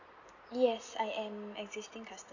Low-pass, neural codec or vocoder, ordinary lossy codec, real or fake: 7.2 kHz; none; none; real